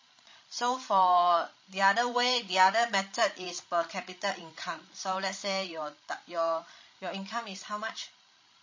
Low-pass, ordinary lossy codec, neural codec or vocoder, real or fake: 7.2 kHz; MP3, 32 kbps; codec, 16 kHz, 16 kbps, FreqCodec, larger model; fake